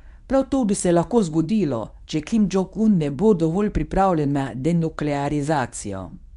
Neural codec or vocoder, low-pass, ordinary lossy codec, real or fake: codec, 24 kHz, 0.9 kbps, WavTokenizer, medium speech release version 1; 10.8 kHz; none; fake